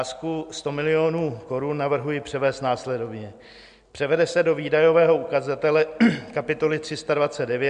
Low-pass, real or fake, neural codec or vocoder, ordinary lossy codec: 9.9 kHz; real; none; MP3, 64 kbps